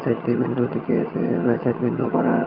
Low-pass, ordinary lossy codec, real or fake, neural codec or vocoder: 5.4 kHz; Opus, 24 kbps; fake; vocoder, 22.05 kHz, 80 mel bands, HiFi-GAN